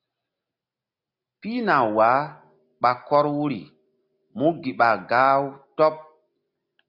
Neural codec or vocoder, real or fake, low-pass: none; real; 5.4 kHz